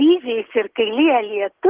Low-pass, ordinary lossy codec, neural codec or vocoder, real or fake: 3.6 kHz; Opus, 16 kbps; none; real